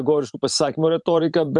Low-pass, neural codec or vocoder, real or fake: 10.8 kHz; vocoder, 44.1 kHz, 128 mel bands every 512 samples, BigVGAN v2; fake